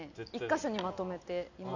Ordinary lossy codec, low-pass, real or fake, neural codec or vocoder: none; 7.2 kHz; real; none